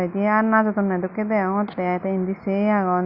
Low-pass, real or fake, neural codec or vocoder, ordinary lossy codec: 5.4 kHz; real; none; Opus, 64 kbps